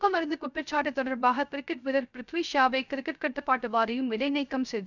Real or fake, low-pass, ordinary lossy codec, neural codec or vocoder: fake; 7.2 kHz; none; codec, 16 kHz, 0.3 kbps, FocalCodec